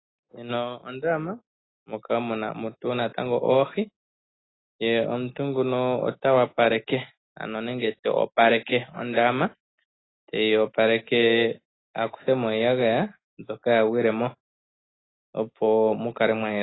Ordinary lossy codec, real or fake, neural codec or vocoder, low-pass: AAC, 16 kbps; real; none; 7.2 kHz